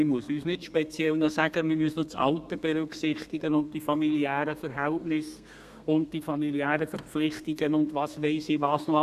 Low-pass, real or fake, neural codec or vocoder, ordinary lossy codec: 14.4 kHz; fake; codec, 32 kHz, 1.9 kbps, SNAC; none